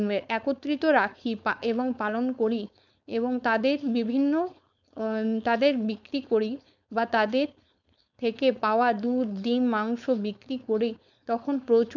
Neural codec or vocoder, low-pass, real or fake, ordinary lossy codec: codec, 16 kHz, 4.8 kbps, FACodec; 7.2 kHz; fake; none